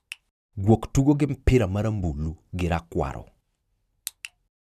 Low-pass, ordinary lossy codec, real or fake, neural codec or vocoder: 14.4 kHz; none; real; none